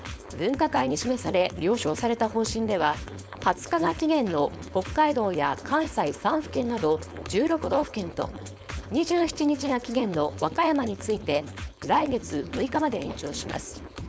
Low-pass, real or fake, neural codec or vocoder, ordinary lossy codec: none; fake; codec, 16 kHz, 4.8 kbps, FACodec; none